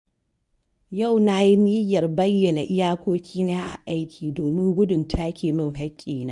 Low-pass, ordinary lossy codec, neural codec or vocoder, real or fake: 10.8 kHz; none; codec, 24 kHz, 0.9 kbps, WavTokenizer, medium speech release version 1; fake